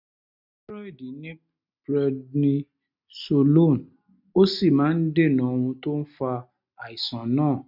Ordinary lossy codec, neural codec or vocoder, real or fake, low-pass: none; none; real; 5.4 kHz